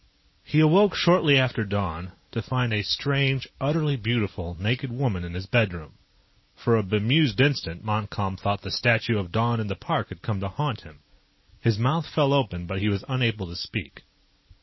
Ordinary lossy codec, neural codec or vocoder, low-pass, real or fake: MP3, 24 kbps; none; 7.2 kHz; real